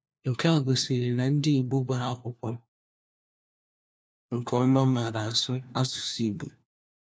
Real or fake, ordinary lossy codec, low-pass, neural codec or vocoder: fake; none; none; codec, 16 kHz, 1 kbps, FunCodec, trained on LibriTTS, 50 frames a second